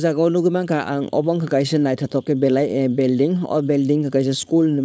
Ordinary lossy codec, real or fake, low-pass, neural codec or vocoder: none; fake; none; codec, 16 kHz, 4.8 kbps, FACodec